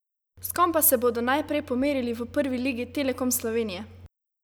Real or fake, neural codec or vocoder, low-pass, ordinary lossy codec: real; none; none; none